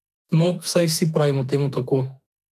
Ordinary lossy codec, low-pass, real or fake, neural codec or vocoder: none; 14.4 kHz; fake; autoencoder, 48 kHz, 32 numbers a frame, DAC-VAE, trained on Japanese speech